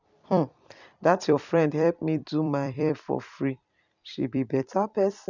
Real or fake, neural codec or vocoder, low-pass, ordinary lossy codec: fake; vocoder, 44.1 kHz, 128 mel bands every 256 samples, BigVGAN v2; 7.2 kHz; none